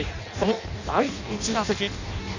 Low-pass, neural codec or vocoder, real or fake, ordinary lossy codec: 7.2 kHz; codec, 16 kHz in and 24 kHz out, 0.6 kbps, FireRedTTS-2 codec; fake; none